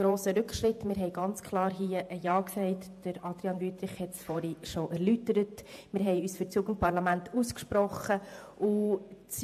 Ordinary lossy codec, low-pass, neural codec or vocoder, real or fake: AAC, 96 kbps; 14.4 kHz; vocoder, 48 kHz, 128 mel bands, Vocos; fake